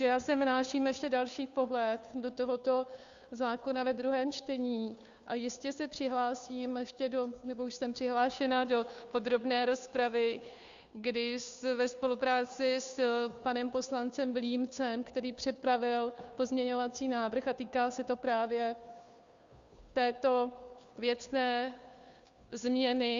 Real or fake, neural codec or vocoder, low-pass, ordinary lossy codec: fake; codec, 16 kHz, 2 kbps, FunCodec, trained on Chinese and English, 25 frames a second; 7.2 kHz; Opus, 64 kbps